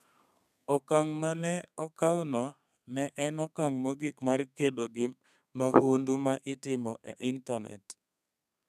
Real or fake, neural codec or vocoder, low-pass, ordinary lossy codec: fake; codec, 32 kHz, 1.9 kbps, SNAC; 14.4 kHz; none